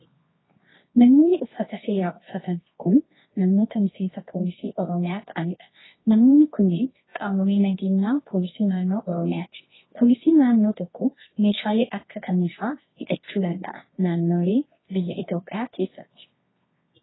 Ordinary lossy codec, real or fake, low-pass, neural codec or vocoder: AAC, 16 kbps; fake; 7.2 kHz; codec, 24 kHz, 0.9 kbps, WavTokenizer, medium music audio release